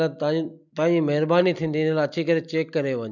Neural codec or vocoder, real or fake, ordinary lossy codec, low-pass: none; real; none; 7.2 kHz